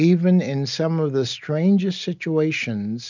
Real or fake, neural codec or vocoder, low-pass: real; none; 7.2 kHz